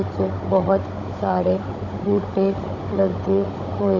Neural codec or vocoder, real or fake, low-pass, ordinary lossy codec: codec, 16 kHz, 16 kbps, FunCodec, trained on Chinese and English, 50 frames a second; fake; 7.2 kHz; none